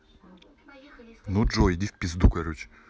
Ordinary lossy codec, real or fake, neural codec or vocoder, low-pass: none; real; none; none